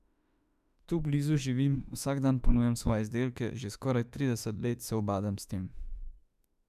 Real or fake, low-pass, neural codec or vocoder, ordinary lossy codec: fake; 14.4 kHz; autoencoder, 48 kHz, 32 numbers a frame, DAC-VAE, trained on Japanese speech; none